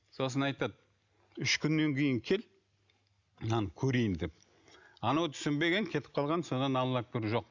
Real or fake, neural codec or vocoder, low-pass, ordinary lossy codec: real; none; 7.2 kHz; none